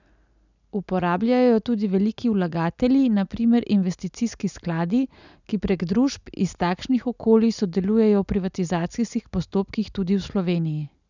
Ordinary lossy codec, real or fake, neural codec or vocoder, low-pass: none; real; none; 7.2 kHz